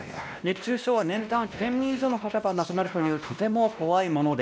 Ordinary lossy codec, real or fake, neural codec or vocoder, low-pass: none; fake; codec, 16 kHz, 1 kbps, X-Codec, WavLM features, trained on Multilingual LibriSpeech; none